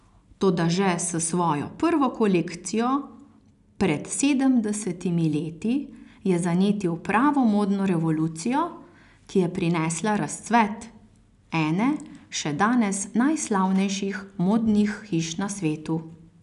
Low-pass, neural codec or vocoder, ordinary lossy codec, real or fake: 10.8 kHz; none; none; real